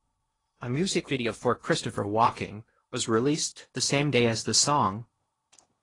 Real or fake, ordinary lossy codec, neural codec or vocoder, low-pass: fake; AAC, 32 kbps; codec, 16 kHz in and 24 kHz out, 0.8 kbps, FocalCodec, streaming, 65536 codes; 10.8 kHz